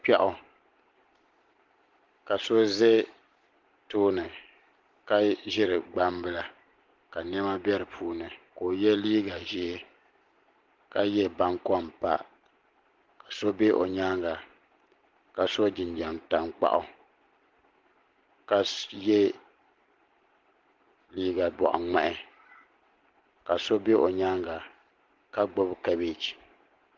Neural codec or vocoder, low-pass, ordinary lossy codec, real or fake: none; 7.2 kHz; Opus, 16 kbps; real